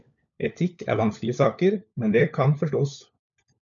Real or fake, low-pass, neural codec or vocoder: fake; 7.2 kHz; codec, 16 kHz, 4 kbps, FunCodec, trained on LibriTTS, 50 frames a second